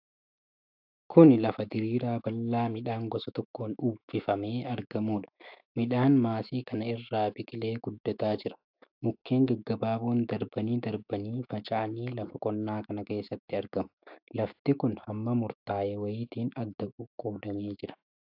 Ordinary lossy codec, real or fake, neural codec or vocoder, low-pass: AAC, 48 kbps; fake; autoencoder, 48 kHz, 128 numbers a frame, DAC-VAE, trained on Japanese speech; 5.4 kHz